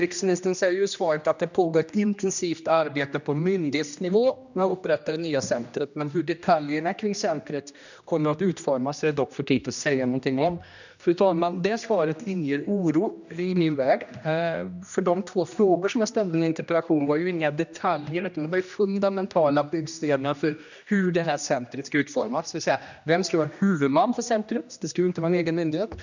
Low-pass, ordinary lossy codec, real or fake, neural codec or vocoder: 7.2 kHz; none; fake; codec, 16 kHz, 1 kbps, X-Codec, HuBERT features, trained on general audio